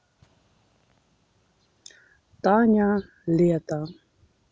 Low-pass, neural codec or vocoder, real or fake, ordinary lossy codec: none; none; real; none